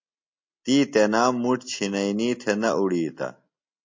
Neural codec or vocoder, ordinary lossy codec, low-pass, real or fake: none; MP3, 48 kbps; 7.2 kHz; real